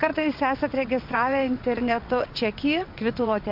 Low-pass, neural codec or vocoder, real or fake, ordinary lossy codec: 5.4 kHz; vocoder, 22.05 kHz, 80 mel bands, WaveNeXt; fake; MP3, 48 kbps